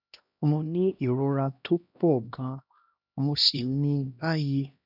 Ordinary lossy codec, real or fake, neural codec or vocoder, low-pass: none; fake; codec, 16 kHz, 1 kbps, X-Codec, HuBERT features, trained on LibriSpeech; 5.4 kHz